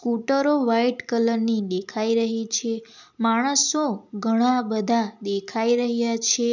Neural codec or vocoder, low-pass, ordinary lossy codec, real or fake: none; 7.2 kHz; none; real